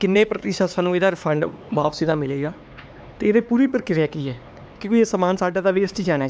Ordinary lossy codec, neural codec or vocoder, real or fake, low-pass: none; codec, 16 kHz, 4 kbps, X-Codec, HuBERT features, trained on LibriSpeech; fake; none